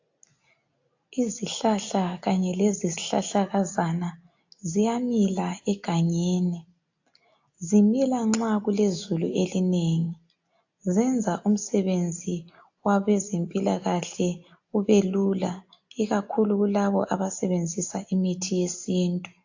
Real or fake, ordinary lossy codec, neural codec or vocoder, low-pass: real; AAC, 48 kbps; none; 7.2 kHz